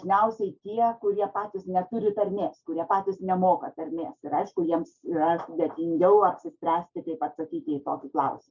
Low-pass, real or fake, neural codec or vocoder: 7.2 kHz; real; none